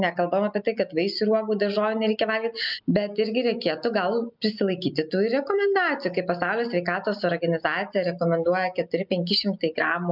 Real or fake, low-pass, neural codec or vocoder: real; 5.4 kHz; none